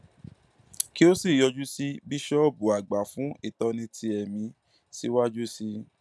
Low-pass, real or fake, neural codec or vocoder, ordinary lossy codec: none; real; none; none